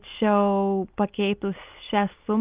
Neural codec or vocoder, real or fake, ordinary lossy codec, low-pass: none; real; Opus, 32 kbps; 3.6 kHz